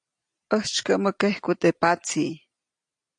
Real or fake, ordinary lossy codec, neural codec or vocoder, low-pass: real; AAC, 64 kbps; none; 9.9 kHz